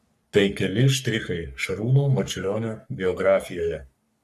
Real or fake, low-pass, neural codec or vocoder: fake; 14.4 kHz; codec, 44.1 kHz, 3.4 kbps, Pupu-Codec